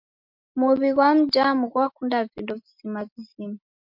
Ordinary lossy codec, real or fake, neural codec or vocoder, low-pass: MP3, 48 kbps; real; none; 5.4 kHz